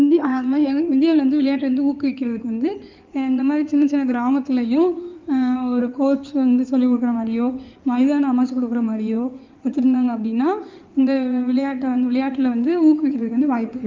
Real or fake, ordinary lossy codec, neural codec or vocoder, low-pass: fake; Opus, 24 kbps; codec, 16 kHz in and 24 kHz out, 2.2 kbps, FireRedTTS-2 codec; 7.2 kHz